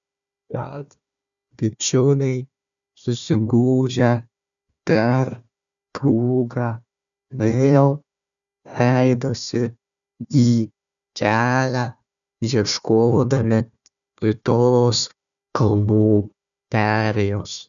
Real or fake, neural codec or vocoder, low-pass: fake; codec, 16 kHz, 1 kbps, FunCodec, trained on Chinese and English, 50 frames a second; 7.2 kHz